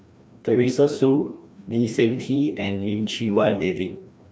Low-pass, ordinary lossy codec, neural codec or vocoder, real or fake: none; none; codec, 16 kHz, 1 kbps, FreqCodec, larger model; fake